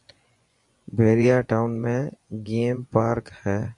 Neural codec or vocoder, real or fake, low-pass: vocoder, 24 kHz, 100 mel bands, Vocos; fake; 10.8 kHz